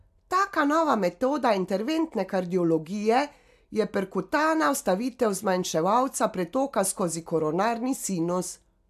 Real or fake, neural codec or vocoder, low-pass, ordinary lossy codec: real; none; 14.4 kHz; AAC, 96 kbps